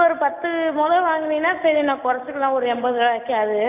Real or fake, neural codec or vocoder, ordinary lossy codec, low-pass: real; none; none; 3.6 kHz